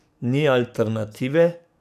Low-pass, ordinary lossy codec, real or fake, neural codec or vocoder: 14.4 kHz; none; fake; codec, 44.1 kHz, 7.8 kbps, DAC